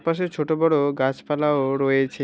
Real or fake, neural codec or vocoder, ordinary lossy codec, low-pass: real; none; none; none